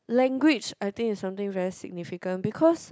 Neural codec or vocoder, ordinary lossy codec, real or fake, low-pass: none; none; real; none